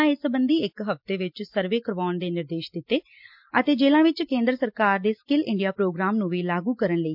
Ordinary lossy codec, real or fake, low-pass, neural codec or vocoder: MP3, 48 kbps; real; 5.4 kHz; none